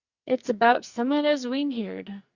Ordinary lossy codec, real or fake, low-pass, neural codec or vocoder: Opus, 64 kbps; fake; 7.2 kHz; codec, 44.1 kHz, 2.6 kbps, SNAC